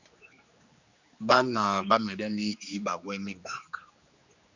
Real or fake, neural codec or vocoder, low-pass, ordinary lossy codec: fake; codec, 16 kHz, 2 kbps, X-Codec, HuBERT features, trained on general audio; 7.2 kHz; Opus, 64 kbps